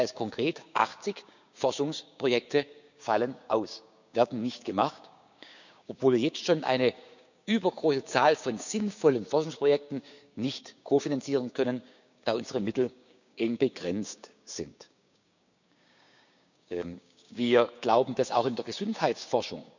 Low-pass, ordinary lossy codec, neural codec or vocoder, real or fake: 7.2 kHz; none; codec, 16 kHz, 6 kbps, DAC; fake